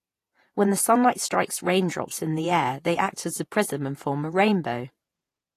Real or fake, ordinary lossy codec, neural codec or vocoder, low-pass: fake; AAC, 48 kbps; vocoder, 48 kHz, 128 mel bands, Vocos; 14.4 kHz